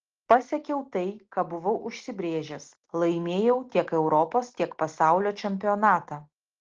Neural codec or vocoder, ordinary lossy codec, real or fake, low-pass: none; Opus, 16 kbps; real; 7.2 kHz